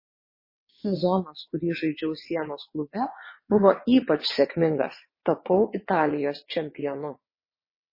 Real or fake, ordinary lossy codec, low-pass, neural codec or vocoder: fake; MP3, 24 kbps; 5.4 kHz; vocoder, 22.05 kHz, 80 mel bands, WaveNeXt